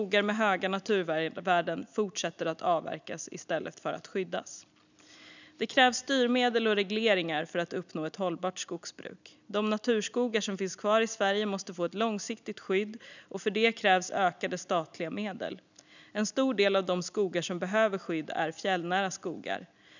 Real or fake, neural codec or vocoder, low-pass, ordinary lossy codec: real; none; 7.2 kHz; none